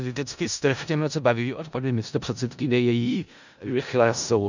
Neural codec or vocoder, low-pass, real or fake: codec, 16 kHz in and 24 kHz out, 0.4 kbps, LongCat-Audio-Codec, four codebook decoder; 7.2 kHz; fake